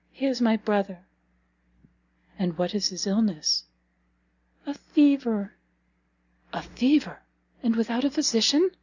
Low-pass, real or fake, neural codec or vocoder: 7.2 kHz; real; none